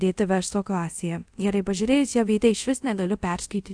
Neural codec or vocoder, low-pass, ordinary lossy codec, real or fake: codec, 24 kHz, 0.5 kbps, DualCodec; 9.9 kHz; AAC, 64 kbps; fake